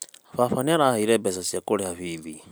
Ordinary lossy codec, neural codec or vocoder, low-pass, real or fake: none; none; none; real